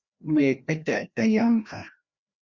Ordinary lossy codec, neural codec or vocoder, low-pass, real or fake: Opus, 64 kbps; codec, 16 kHz, 1 kbps, FreqCodec, larger model; 7.2 kHz; fake